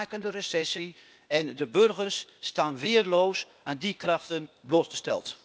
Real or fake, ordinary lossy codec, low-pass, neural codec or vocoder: fake; none; none; codec, 16 kHz, 0.8 kbps, ZipCodec